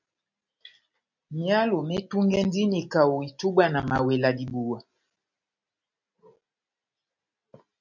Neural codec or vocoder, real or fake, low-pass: none; real; 7.2 kHz